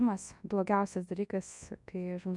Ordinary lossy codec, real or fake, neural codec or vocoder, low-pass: MP3, 96 kbps; fake; codec, 24 kHz, 0.9 kbps, WavTokenizer, large speech release; 10.8 kHz